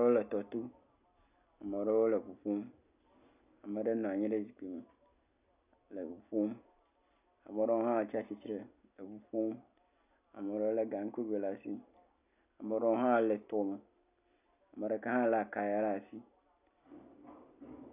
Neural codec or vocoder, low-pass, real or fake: none; 3.6 kHz; real